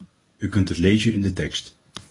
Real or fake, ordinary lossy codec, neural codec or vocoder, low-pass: fake; AAC, 32 kbps; codec, 24 kHz, 0.9 kbps, WavTokenizer, medium speech release version 1; 10.8 kHz